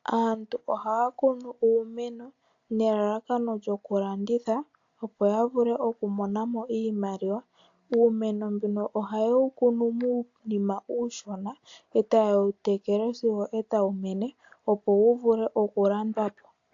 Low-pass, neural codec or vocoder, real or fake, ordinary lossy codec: 7.2 kHz; none; real; AAC, 48 kbps